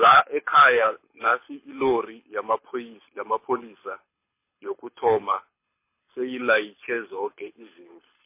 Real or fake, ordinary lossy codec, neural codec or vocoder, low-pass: fake; MP3, 24 kbps; codec, 24 kHz, 6 kbps, HILCodec; 3.6 kHz